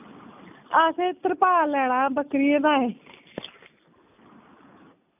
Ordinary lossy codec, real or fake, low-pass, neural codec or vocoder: none; real; 3.6 kHz; none